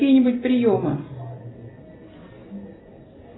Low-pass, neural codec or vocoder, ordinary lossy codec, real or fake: 7.2 kHz; none; AAC, 16 kbps; real